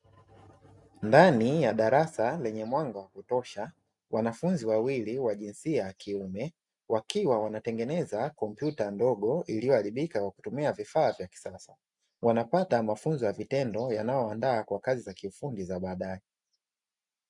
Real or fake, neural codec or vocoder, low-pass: real; none; 10.8 kHz